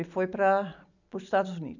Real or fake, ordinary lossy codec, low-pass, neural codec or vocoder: real; none; 7.2 kHz; none